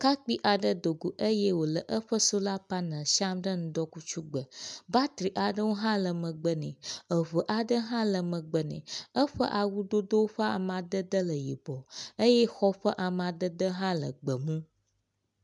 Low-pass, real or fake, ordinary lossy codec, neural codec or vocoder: 10.8 kHz; real; MP3, 96 kbps; none